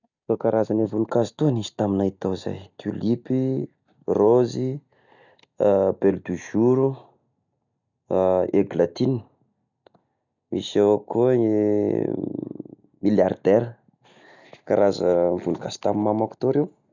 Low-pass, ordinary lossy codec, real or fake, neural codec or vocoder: 7.2 kHz; none; real; none